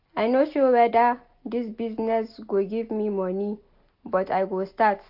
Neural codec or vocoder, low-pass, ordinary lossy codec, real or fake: none; 5.4 kHz; AAC, 48 kbps; real